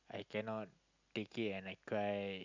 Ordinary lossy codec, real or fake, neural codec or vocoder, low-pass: none; real; none; 7.2 kHz